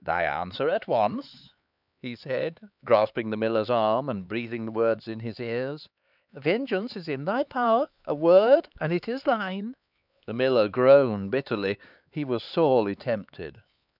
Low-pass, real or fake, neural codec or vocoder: 5.4 kHz; fake; codec, 16 kHz, 4 kbps, X-Codec, HuBERT features, trained on LibriSpeech